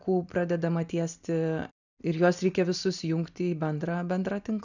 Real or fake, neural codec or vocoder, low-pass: real; none; 7.2 kHz